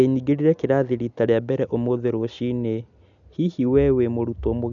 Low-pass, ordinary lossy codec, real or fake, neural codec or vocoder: 7.2 kHz; none; real; none